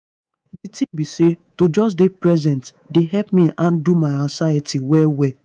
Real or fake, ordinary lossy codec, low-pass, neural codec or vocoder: fake; none; 9.9 kHz; codec, 24 kHz, 3.1 kbps, DualCodec